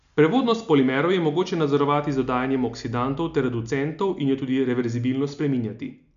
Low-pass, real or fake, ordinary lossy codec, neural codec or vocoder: 7.2 kHz; real; AAC, 96 kbps; none